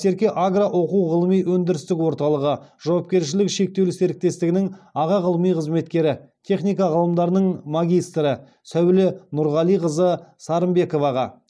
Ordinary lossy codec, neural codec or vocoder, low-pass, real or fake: none; none; none; real